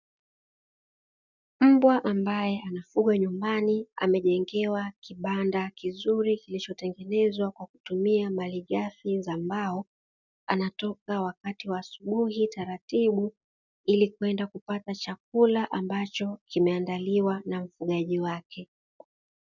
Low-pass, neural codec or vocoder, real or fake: 7.2 kHz; none; real